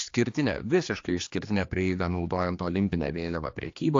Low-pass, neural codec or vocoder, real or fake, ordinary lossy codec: 7.2 kHz; codec, 16 kHz, 2 kbps, X-Codec, HuBERT features, trained on general audio; fake; AAC, 48 kbps